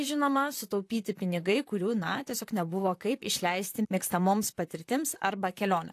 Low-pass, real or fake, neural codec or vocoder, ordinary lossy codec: 14.4 kHz; fake; vocoder, 44.1 kHz, 128 mel bands, Pupu-Vocoder; AAC, 64 kbps